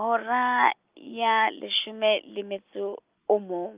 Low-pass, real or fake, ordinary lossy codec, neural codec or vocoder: 3.6 kHz; real; Opus, 24 kbps; none